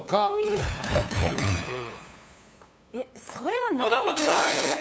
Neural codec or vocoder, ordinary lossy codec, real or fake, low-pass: codec, 16 kHz, 2 kbps, FunCodec, trained on LibriTTS, 25 frames a second; none; fake; none